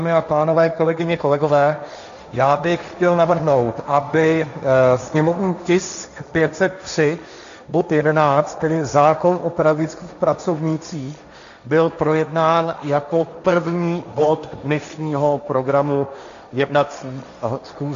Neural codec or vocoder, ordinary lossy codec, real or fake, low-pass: codec, 16 kHz, 1.1 kbps, Voila-Tokenizer; MP3, 64 kbps; fake; 7.2 kHz